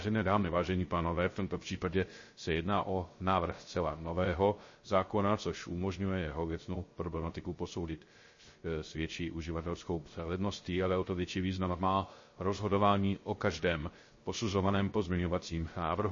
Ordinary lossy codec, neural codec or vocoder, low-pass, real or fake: MP3, 32 kbps; codec, 16 kHz, 0.3 kbps, FocalCodec; 7.2 kHz; fake